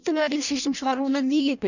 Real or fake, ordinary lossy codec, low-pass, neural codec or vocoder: fake; none; 7.2 kHz; codec, 16 kHz in and 24 kHz out, 0.6 kbps, FireRedTTS-2 codec